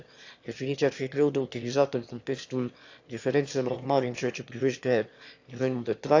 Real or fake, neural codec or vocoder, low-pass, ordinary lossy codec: fake; autoencoder, 22.05 kHz, a latent of 192 numbers a frame, VITS, trained on one speaker; 7.2 kHz; AAC, 48 kbps